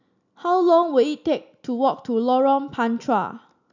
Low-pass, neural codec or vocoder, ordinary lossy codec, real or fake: 7.2 kHz; none; none; real